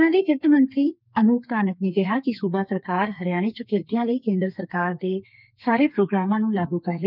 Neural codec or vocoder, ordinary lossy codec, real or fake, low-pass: codec, 32 kHz, 1.9 kbps, SNAC; none; fake; 5.4 kHz